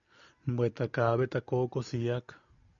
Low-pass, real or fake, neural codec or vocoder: 7.2 kHz; real; none